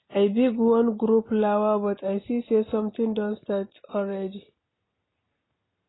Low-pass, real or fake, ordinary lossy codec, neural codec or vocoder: 7.2 kHz; real; AAC, 16 kbps; none